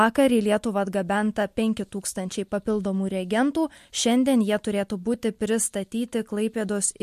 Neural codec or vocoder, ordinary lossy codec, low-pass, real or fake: none; MP3, 64 kbps; 14.4 kHz; real